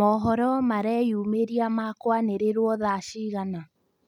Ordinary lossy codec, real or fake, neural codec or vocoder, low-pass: none; real; none; 19.8 kHz